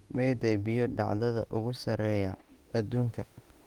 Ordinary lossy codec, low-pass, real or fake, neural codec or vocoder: Opus, 24 kbps; 19.8 kHz; fake; autoencoder, 48 kHz, 32 numbers a frame, DAC-VAE, trained on Japanese speech